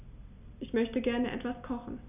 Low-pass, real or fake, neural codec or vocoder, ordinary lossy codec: 3.6 kHz; real; none; none